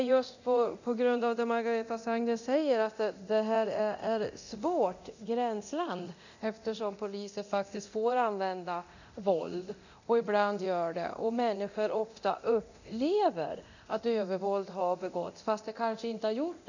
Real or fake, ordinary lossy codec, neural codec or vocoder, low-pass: fake; none; codec, 24 kHz, 0.9 kbps, DualCodec; 7.2 kHz